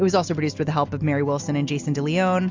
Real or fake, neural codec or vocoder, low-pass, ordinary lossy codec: real; none; 7.2 kHz; MP3, 64 kbps